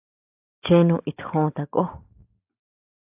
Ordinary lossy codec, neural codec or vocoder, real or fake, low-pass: AAC, 32 kbps; none; real; 3.6 kHz